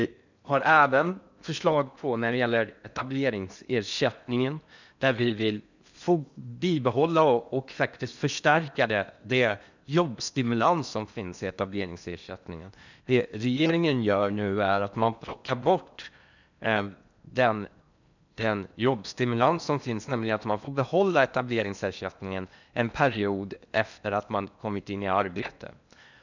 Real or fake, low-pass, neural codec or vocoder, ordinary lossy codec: fake; 7.2 kHz; codec, 16 kHz in and 24 kHz out, 0.8 kbps, FocalCodec, streaming, 65536 codes; none